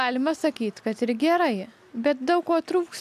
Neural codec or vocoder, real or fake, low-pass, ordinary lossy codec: none; real; 14.4 kHz; AAC, 96 kbps